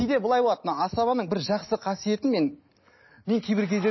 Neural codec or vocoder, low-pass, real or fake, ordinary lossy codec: none; 7.2 kHz; real; MP3, 24 kbps